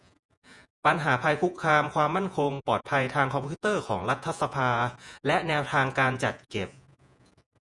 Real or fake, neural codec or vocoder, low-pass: fake; vocoder, 48 kHz, 128 mel bands, Vocos; 10.8 kHz